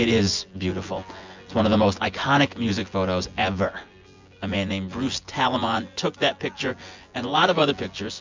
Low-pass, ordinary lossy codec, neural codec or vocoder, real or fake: 7.2 kHz; MP3, 64 kbps; vocoder, 24 kHz, 100 mel bands, Vocos; fake